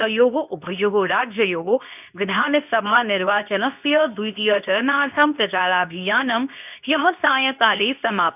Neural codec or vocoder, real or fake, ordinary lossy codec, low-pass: codec, 24 kHz, 0.9 kbps, WavTokenizer, medium speech release version 2; fake; none; 3.6 kHz